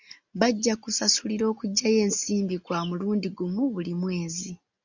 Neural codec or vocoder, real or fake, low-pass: none; real; 7.2 kHz